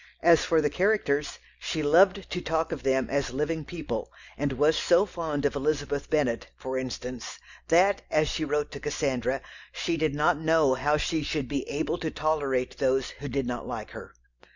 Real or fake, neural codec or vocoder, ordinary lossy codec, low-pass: real; none; Opus, 64 kbps; 7.2 kHz